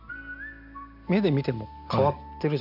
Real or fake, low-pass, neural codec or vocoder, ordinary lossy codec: real; 5.4 kHz; none; none